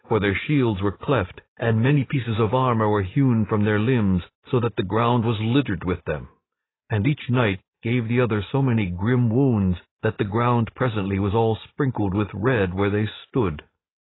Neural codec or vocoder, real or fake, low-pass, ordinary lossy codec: none; real; 7.2 kHz; AAC, 16 kbps